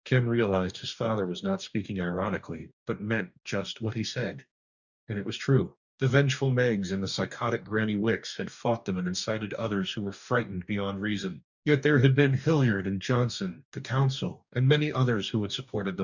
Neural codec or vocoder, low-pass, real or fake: codec, 44.1 kHz, 2.6 kbps, DAC; 7.2 kHz; fake